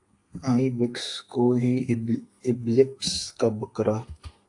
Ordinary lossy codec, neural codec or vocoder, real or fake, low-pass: AAC, 48 kbps; codec, 32 kHz, 1.9 kbps, SNAC; fake; 10.8 kHz